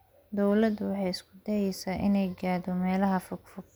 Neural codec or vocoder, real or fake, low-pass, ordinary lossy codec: none; real; none; none